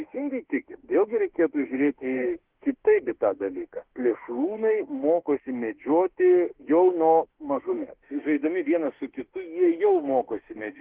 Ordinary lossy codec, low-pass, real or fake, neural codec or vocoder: Opus, 16 kbps; 3.6 kHz; fake; autoencoder, 48 kHz, 32 numbers a frame, DAC-VAE, trained on Japanese speech